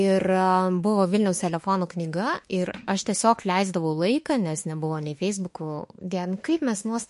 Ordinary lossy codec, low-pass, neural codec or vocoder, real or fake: MP3, 48 kbps; 14.4 kHz; autoencoder, 48 kHz, 32 numbers a frame, DAC-VAE, trained on Japanese speech; fake